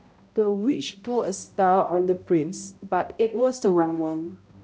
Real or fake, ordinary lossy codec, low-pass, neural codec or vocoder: fake; none; none; codec, 16 kHz, 0.5 kbps, X-Codec, HuBERT features, trained on balanced general audio